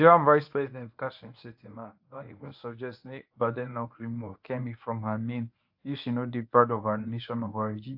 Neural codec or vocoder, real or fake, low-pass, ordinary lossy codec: codec, 24 kHz, 0.9 kbps, WavTokenizer, medium speech release version 1; fake; 5.4 kHz; AAC, 48 kbps